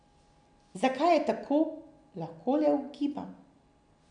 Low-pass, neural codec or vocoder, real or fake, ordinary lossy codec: 9.9 kHz; none; real; none